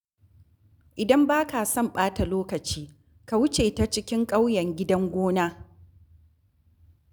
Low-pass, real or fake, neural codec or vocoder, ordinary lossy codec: none; real; none; none